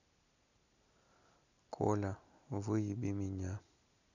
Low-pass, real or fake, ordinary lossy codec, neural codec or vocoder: 7.2 kHz; real; none; none